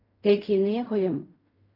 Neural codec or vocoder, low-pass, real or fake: codec, 16 kHz in and 24 kHz out, 0.4 kbps, LongCat-Audio-Codec, fine tuned four codebook decoder; 5.4 kHz; fake